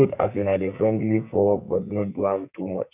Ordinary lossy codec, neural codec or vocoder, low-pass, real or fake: none; codec, 44.1 kHz, 2.6 kbps, SNAC; 3.6 kHz; fake